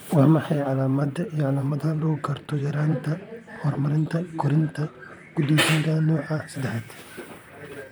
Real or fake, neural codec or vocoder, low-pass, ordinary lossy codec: fake; vocoder, 44.1 kHz, 128 mel bands, Pupu-Vocoder; none; none